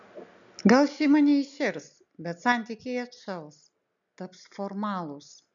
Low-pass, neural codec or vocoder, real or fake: 7.2 kHz; none; real